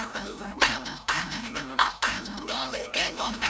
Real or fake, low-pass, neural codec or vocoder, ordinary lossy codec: fake; none; codec, 16 kHz, 0.5 kbps, FreqCodec, larger model; none